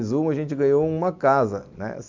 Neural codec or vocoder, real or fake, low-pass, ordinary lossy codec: none; real; 7.2 kHz; MP3, 64 kbps